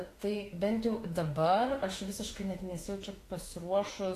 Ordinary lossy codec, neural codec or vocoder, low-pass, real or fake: AAC, 48 kbps; autoencoder, 48 kHz, 32 numbers a frame, DAC-VAE, trained on Japanese speech; 14.4 kHz; fake